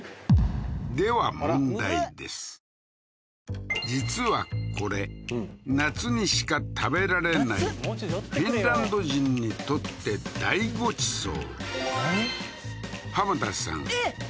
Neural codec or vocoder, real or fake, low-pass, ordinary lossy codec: none; real; none; none